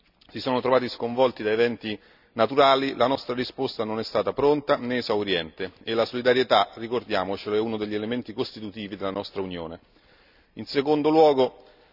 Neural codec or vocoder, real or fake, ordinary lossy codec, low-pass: none; real; none; 5.4 kHz